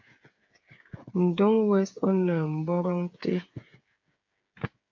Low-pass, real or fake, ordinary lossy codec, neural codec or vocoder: 7.2 kHz; fake; AAC, 48 kbps; codec, 16 kHz, 8 kbps, FreqCodec, smaller model